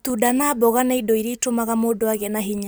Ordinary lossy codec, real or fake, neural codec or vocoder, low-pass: none; real; none; none